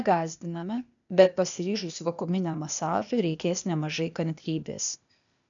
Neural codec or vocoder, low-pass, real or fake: codec, 16 kHz, 0.8 kbps, ZipCodec; 7.2 kHz; fake